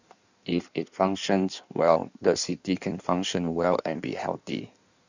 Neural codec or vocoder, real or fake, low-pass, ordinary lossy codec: codec, 16 kHz in and 24 kHz out, 1.1 kbps, FireRedTTS-2 codec; fake; 7.2 kHz; none